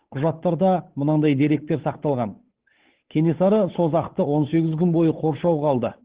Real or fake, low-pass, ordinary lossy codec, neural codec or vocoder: fake; 3.6 kHz; Opus, 16 kbps; codec, 16 kHz, 8 kbps, FunCodec, trained on Chinese and English, 25 frames a second